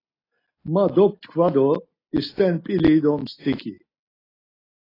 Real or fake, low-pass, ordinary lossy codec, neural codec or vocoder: real; 5.4 kHz; AAC, 24 kbps; none